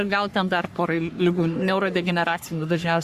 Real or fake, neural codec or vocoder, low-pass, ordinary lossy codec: fake; codec, 44.1 kHz, 3.4 kbps, Pupu-Codec; 14.4 kHz; Opus, 64 kbps